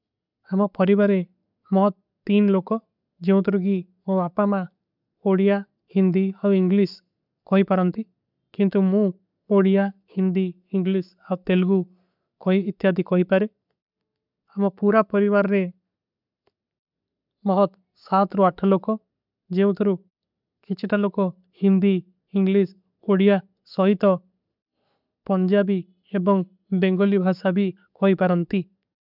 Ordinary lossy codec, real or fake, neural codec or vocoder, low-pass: none; real; none; 5.4 kHz